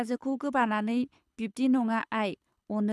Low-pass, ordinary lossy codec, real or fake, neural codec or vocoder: none; none; fake; codec, 24 kHz, 6 kbps, HILCodec